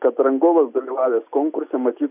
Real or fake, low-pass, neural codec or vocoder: real; 3.6 kHz; none